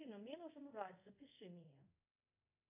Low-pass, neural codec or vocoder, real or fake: 3.6 kHz; codec, 24 kHz, 0.5 kbps, DualCodec; fake